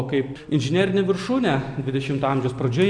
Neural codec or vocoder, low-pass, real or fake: none; 9.9 kHz; real